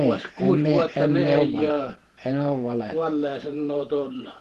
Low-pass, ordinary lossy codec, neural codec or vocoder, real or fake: 14.4 kHz; Opus, 16 kbps; vocoder, 48 kHz, 128 mel bands, Vocos; fake